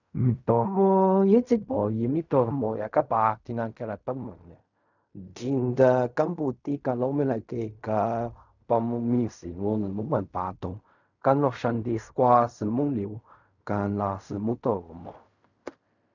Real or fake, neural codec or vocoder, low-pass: fake; codec, 16 kHz in and 24 kHz out, 0.4 kbps, LongCat-Audio-Codec, fine tuned four codebook decoder; 7.2 kHz